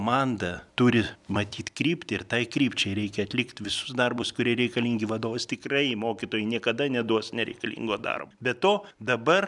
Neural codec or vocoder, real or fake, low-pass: vocoder, 44.1 kHz, 128 mel bands every 256 samples, BigVGAN v2; fake; 10.8 kHz